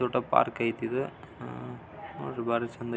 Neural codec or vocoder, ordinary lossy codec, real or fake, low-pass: none; none; real; none